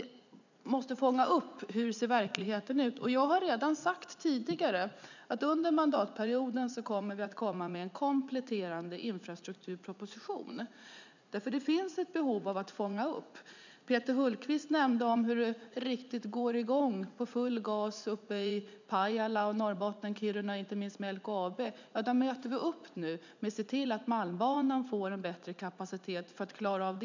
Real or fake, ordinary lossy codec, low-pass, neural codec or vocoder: fake; none; 7.2 kHz; vocoder, 44.1 kHz, 80 mel bands, Vocos